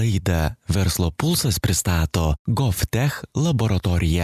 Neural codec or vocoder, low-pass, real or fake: none; 14.4 kHz; real